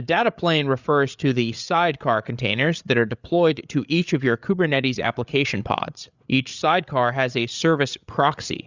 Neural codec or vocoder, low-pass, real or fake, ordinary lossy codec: codec, 16 kHz, 8 kbps, FreqCodec, larger model; 7.2 kHz; fake; Opus, 64 kbps